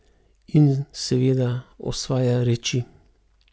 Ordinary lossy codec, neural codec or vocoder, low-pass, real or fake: none; none; none; real